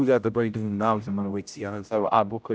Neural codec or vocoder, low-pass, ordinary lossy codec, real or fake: codec, 16 kHz, 0.5 kbps, X-Codec, HuBERT features, trained on general audio; none; none; fake